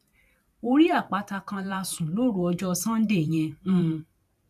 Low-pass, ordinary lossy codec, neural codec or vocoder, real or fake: 14.4 kHz; MP3, 96 kbps; vocoder, 44.1 kHz, 128 mel bands every 512 samples, BigVGAN v2; fake